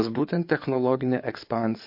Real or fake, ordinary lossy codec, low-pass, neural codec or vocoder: fake; MP3, 32 kbps; 5.4 kHz; codec, 16 kHz in and 24 kHz out, 2.2 kbps, FireRedTTS-2 codec